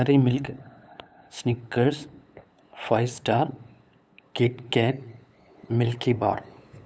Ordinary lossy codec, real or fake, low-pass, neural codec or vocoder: none; fake; none; codec, 16 kHz, 8 kbps, FunCodec, trained on LibriTTS, 25 frames a second